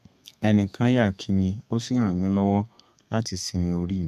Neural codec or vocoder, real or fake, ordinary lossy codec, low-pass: codec, 32 kHz, 1.9 kbps, SNAC; fake; none; 14.4 kHz